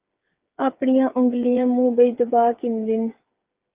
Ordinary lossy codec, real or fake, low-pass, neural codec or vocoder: Opus, 32 kbps; fake; 3.6 kHz; codec, 16 kHz, 8 kbps, FreqCodec, smaller model